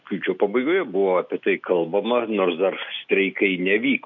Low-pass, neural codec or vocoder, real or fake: 7.2 kHz; none; real